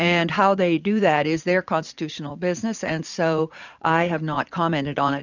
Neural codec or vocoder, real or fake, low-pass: vocoder, 44.1 kHz, 80 mel bands, Vocos; fake; 7.2 kHz